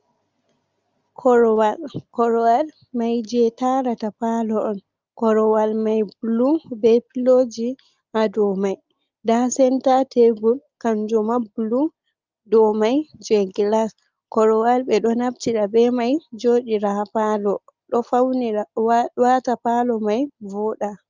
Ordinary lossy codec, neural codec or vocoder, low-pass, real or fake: Opus, 32 kbps; none; 7.2 kHz; real